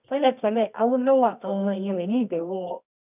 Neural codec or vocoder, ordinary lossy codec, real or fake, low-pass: codec, 24 kHz, 0.9 kbps, WavTokenizer, medium music audio release; none; fake; 3.6 kHz